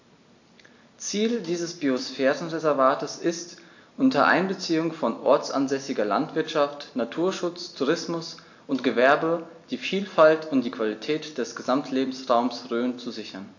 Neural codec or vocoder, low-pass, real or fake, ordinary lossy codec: none; 7.2 kHz; real; AAC, 48 kbps